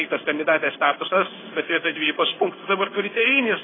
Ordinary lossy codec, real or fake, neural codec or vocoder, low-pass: AAC, 16 kbps; fake; codec, 16 kHz in and 24 kHz out, 1 kbps, XY-Tokenizer; 7.2 kHz